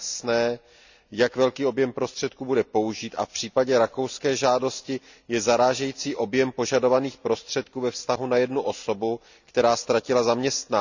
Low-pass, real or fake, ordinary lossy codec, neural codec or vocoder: 7.2 kHz; real; none; none